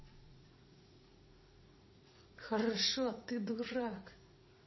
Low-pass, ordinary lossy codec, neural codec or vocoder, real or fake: 7.2 kHz; MP3, 24 kbps; vocoder, 44.1 kHz, 80 mel bands, Vocos; fake